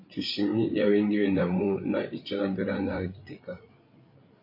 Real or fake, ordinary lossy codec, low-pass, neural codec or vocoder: fake; MP3, 32 kbps; 5.4 kHz; codec, 16 kHz, 8 kbps, FreqCodec, larger model